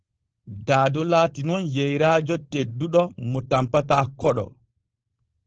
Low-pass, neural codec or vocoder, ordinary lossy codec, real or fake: 7.2 kHz; codec, 16 kHz, 4.8 kbps, FACodec; Opus, 16 kbps; fake